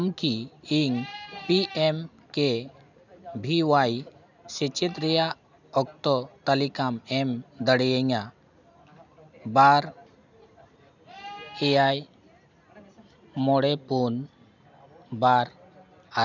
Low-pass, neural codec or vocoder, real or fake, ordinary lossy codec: 7.2 kHz; none; real; none